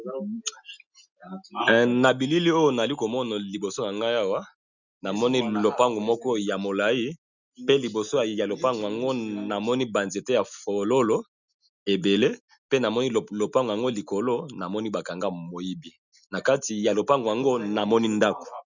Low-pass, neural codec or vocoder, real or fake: 7.2 kHz; none; real